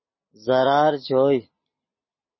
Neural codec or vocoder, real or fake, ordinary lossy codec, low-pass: none; real; MP3, 24 kbps; 7.2 kHz